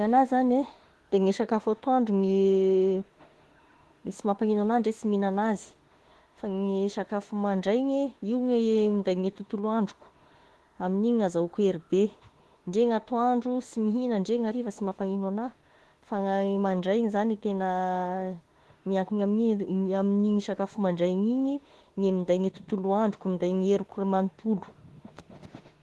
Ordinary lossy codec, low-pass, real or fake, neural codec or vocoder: Opus, 16 kbps; 10.8 kHz; fake; autoencoder, 48 kHz, 32 numbers a frame, DAC-VAE, trained on Japanese speech